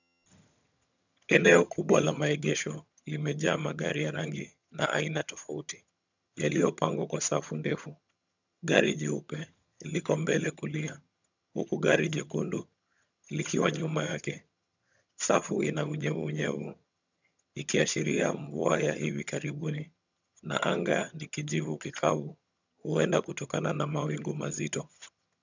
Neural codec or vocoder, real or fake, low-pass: vocoder, 22.05 kHz, 80 mel bands, HiFi-GAN; fake; 7.2 kHz